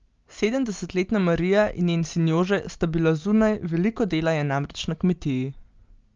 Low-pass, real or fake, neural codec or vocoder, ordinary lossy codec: 7.2 kHz; real; none; Opus, 24 kbps